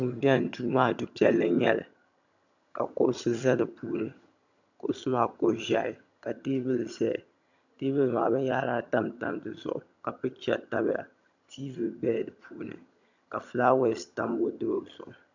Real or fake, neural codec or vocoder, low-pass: fake; vocoder, 22.05 kHz, 80 mel bands, HiFi-GAN; 7.2 kHz